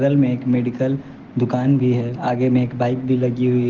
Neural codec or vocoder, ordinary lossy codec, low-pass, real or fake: none; Opus, 16 kbps; 7.2 kHz; real